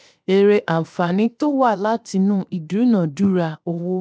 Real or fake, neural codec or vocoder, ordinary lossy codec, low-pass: fake; codec, 16 kHz, 0.7 kbps, FocalCodec; none; none